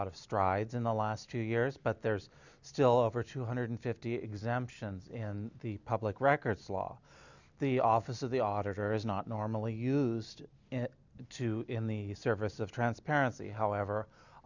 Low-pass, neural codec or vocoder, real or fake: 7.2 kHz; none; real